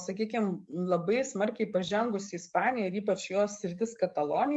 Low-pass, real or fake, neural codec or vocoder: 10.8 kHz; fake; codec, 44.1 kHz, 7.8 kbps, DAC